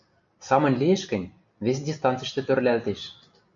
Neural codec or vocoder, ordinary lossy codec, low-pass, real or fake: none; MP3, 64 kbps; 7.2 kHz; real